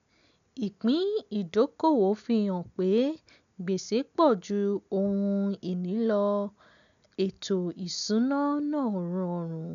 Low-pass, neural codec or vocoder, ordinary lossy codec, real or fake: 7.2 kHz; none; none; real